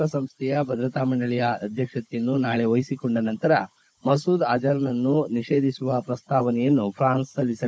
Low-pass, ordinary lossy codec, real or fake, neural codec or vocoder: none; none; fake; codec, 16 kHz, 16 kbps, FunCodec, trained on Chinese and English, 50 frames a second